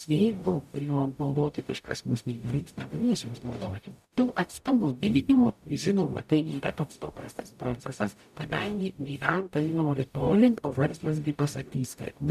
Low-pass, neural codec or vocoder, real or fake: 14.4 kHz; codec, 44.1 kHz, 0.9 kbps, DAC; fake